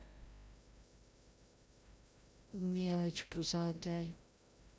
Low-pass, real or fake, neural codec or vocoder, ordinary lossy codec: none; fake; codec, 16 kHz, 0.5 kbps, FreqCodec, larger model; none